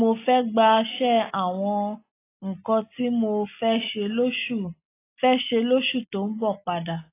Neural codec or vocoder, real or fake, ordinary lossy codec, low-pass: none; real; AAC, 24 kbps; 3.6 kHz